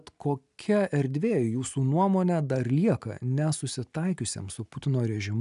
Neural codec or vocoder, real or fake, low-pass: none; real; 10.8 kHz